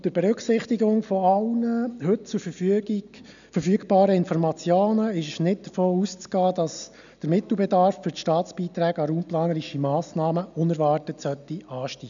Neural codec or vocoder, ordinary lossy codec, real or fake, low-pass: none; none; real; 7.2 kHz